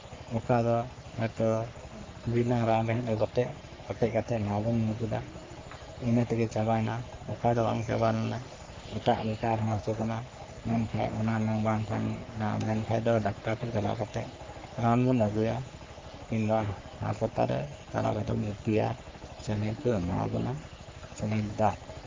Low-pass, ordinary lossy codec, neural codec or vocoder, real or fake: 7.2 kHz; Opus, 24 kbps; codec, 44.1 kHz, 3.4 kbps, Pupu-Codec; fake